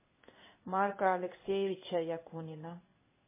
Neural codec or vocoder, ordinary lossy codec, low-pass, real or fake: codec, 16 kHz in and 24 kHz out, 1 kbps, XY-Tokenizer; MP3, 16 kbps; 3.6 kHz; fake